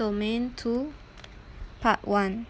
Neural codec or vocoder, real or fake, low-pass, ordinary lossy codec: none; real; none; none